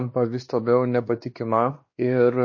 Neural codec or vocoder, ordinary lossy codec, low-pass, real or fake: codec, 16 kHz, 2 kbps, X-Codec, WavLM features, trained on Multilingual LibriSpeech; MP3, 32 kbps; 7.2 kHz; fake